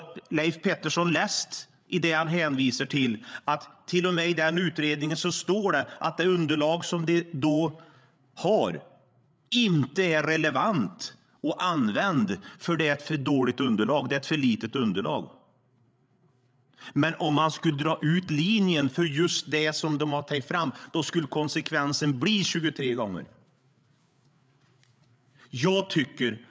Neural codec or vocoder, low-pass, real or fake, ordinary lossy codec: codec, 16 kHz, 8 kbps, FreqCodec, larger model; none; fake; none